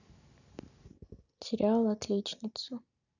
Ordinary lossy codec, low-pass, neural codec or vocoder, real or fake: none; 7.2 kHz; none; real